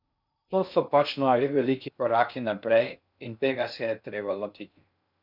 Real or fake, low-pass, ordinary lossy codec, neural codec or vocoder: fake; 5.4 kHz; none; codec, 16 kHz in and 24 kHz out, 0.6 kbps, FocalCodec, streaming, 4096 codes